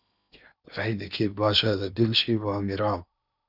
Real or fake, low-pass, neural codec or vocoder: fake; 5.4 kHz; codec, 16 kHz in and 24 kHz out, 0.8 kbps, FocalCodec, streaming, 65536 codes